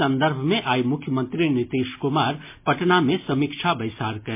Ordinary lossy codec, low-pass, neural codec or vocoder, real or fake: MP3, 24 kbps; 3.6 kHz; none; real